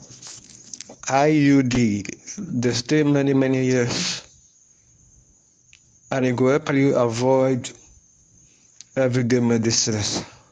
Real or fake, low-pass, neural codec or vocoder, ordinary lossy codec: fake; none; codec, 24 kHz, 0.9 kbps, WavTokenizer, medium speech release version 1; none